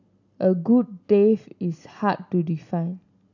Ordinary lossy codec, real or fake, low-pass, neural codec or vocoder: none; real; 7.2 kHz; none